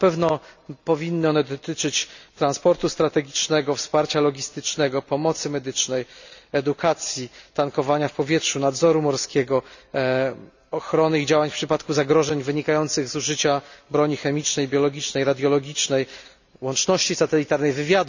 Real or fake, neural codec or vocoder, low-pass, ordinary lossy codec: real; none; 7.2 kHz; none